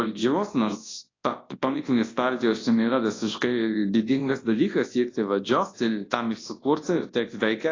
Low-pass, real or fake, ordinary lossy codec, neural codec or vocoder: 7.2 kHz; fake; AAC, 32 kbps; codec, 24 kHz, 0.9 kbps, WavTokenizer, large speech release